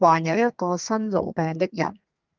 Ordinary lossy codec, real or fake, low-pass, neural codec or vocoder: Opus, 24 kbps; fake; 7.2 kHz; codec, 32 kHz, 1.9 kbps, SNAC